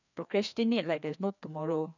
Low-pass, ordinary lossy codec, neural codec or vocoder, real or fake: 7.2 kHz; none; codec, 16 kHz, 2 kbps, FreqCodec, larger model; fake